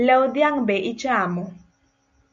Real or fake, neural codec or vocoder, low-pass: real; none; 7.2 kHz